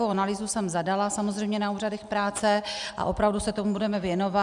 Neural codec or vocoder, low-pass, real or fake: none; 10.8 kHz; real